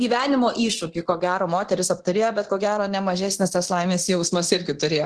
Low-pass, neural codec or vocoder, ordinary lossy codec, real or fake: 10.8 kHz; codec, 24 kHz, 0.9 kbps, DualCodec; Opus, 16 kbps; fake